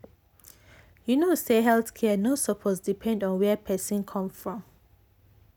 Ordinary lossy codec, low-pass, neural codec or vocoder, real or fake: none; none; none; real